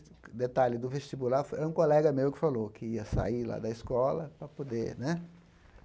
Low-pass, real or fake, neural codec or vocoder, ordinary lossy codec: none; real; none; none